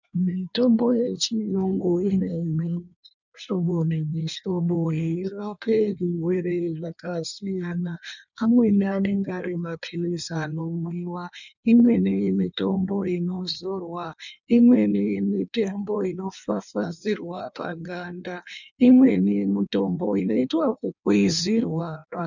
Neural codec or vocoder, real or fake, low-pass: codec, 16 kHz in and 24 kHz out, 1.1 kbps, FireRedTTS-2 codec; fake; 7.2 kHz